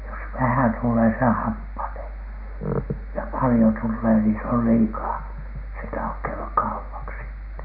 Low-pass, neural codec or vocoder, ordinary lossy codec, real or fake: 5.4 kHz; none; none; real